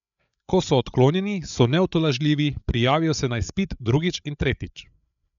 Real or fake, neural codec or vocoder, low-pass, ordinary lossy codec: fake; codec, 16 kHz, 8 kbps, FreqCodec, larger model; 7.2 kHz; none